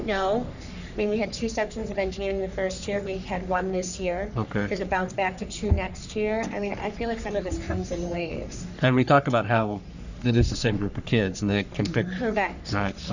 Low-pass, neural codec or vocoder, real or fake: 7.2 kHz; codec, 44.1 kHz, 3.4 kbps, Pupu-Codec; fake